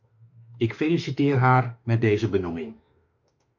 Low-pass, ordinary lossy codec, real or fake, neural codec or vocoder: 7.2 kHz; MP3, 48 kbps; fake; autoencoder, 48 kHz, 32 numbers a frame, DAC-VAE, trained on Japanese speech